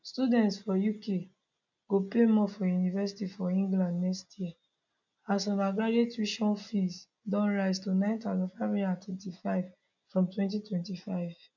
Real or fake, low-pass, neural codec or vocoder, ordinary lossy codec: real; 7.2 kHz; none; none